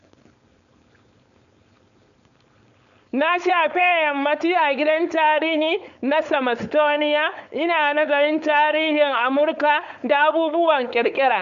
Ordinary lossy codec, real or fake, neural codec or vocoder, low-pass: none; fake; codec, 16 kHz, 4.8 kbps, FACodec; 7.2 kHz